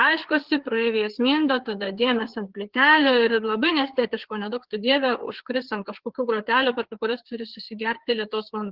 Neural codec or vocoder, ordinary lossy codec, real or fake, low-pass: codec, 16 kHz, 4 kbps, FreqCodec, larger model; Opus, 16 kbps; fake; 5.4 kHz